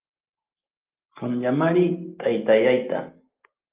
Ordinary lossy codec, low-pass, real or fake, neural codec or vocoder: Opus, 32 kbps; 3.6 kHz; real; none